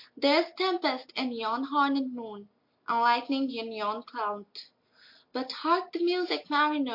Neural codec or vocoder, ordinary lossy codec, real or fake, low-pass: none; MP3, 32 kbps; real; 5.4 kHz